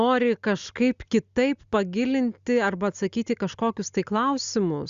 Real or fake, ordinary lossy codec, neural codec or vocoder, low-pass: real; Opus, 64 kbps; none; 7.2 kHz